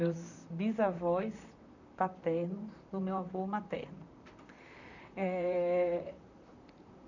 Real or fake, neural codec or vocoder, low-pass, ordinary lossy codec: fake; vocoder, 44.1 kHz, 128 mel bands, Pupu-Vocoder; 7.2 kHz; none